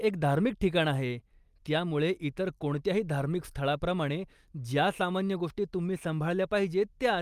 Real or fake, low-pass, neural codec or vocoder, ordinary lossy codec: real; 14.4 kHz; none; Opus, 32 kbps